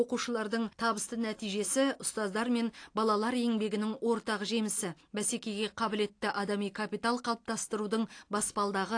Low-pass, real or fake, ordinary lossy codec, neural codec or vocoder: 9.9 kHz; real; AAC, 48 kbps; none